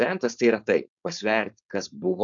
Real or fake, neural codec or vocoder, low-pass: fake; codec, 16 kHz, 4.8 kbps, FACodec; 7.2 kHz